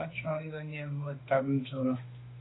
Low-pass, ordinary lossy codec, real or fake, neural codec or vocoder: 7.2 kHz; AAC, 16 kbps; fake; autoencoder, 48 kHz, 32 numbers a frame, DAC-VAE, trained on Japanese speech